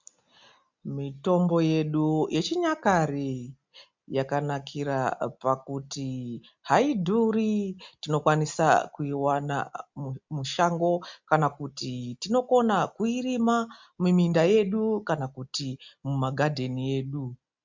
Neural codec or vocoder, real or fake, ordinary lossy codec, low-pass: none; real; MP3, 64 kbps; 7.2 kHz